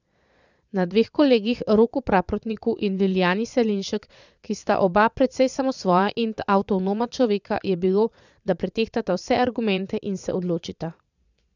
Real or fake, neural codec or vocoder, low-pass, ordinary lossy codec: fake; vocoder, 22.05 kHz, 80 mel bands, Vocos; 7.2 kHz; none